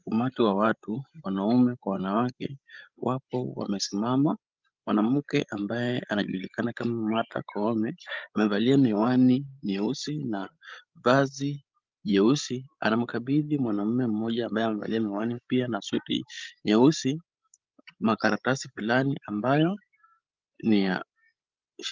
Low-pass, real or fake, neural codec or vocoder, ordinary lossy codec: 7.2 kHz; fake; codec, 16 kHz, 16 kbps, FreqCodec, larger model; Opus, 24 kbps